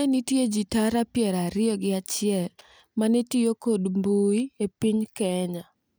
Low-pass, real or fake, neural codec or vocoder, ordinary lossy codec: none; real; none; none